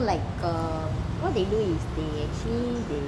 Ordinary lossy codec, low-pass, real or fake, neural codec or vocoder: none; none; real; none